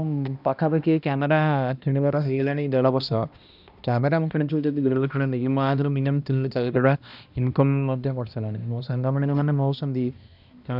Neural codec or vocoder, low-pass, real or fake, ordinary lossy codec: codec, 16 kHz, 1 kbps, X-Codec, HuBERT features, trained on balanced general audio; 5.4 kHz; fake; none